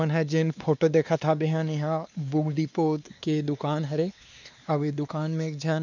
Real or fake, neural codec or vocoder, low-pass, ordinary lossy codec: fake; codec, 16 kHz, 2 kbps, X-Codec, WavLM features, trained on Multilingual LibriSpeech; 7.2 kHz; none